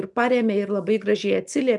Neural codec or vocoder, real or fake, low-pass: none; real; 10.8 kHz